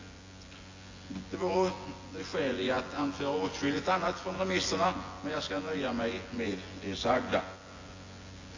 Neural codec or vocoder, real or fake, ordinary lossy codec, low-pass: vocoder, 24 kHz, 100 mel bands, Vocos; fake; AAC, 32 kbps; 7.2 kHz